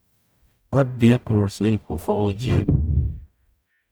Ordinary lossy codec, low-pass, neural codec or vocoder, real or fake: none; none; codec, 44.1 kHz, 0.9 kbps, DAC; fake